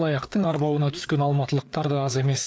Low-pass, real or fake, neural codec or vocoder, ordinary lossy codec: none; fake; codec, 16 kHz, 4 kbps, FreqCodec, larger model; none